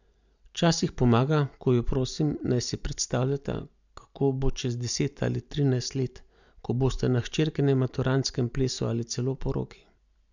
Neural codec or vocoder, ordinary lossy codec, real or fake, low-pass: none; none; real; 7.2 kHz